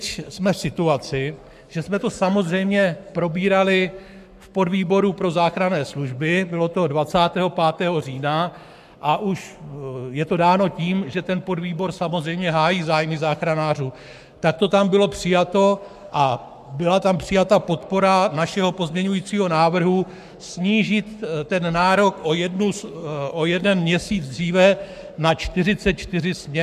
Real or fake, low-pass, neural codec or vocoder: fake; 14.4 kHz; codec, 44.1 kHz, 7.8 kbps, Pupu-Codec